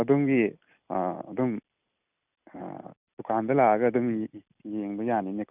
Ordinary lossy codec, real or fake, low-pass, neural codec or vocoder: none; real; 3.6 kHz; none